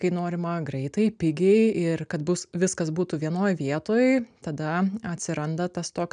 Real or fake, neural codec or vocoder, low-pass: real; none; 9.9 kHz